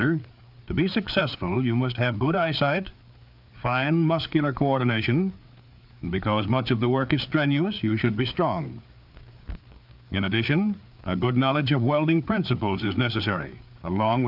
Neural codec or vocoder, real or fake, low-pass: codec, 16 kHz, 4 kbps, FreqCodec, larger model; fake; 5.4 kHz